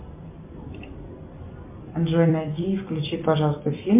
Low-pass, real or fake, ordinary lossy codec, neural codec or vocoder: 3.6 kHz; fake; MP3, 32 kbps; vocoder, 44.1 kHz, 128 mel bands every 256 samples, BigVGAN v2